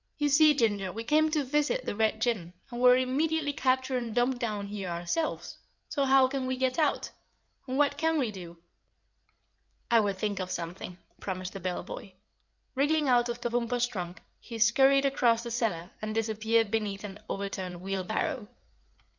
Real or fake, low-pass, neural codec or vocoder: fake; 7.2 kHz; codec, 16 kHz in and 24 kHz out, 2.2 kbps, FireRedTTS-2 codec